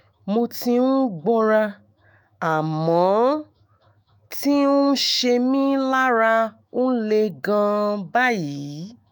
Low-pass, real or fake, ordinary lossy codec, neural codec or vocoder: none; fake; none; autoencoder, 48 kHz, 128 numbers a frame, DAC-VAE, trained on Japanese speech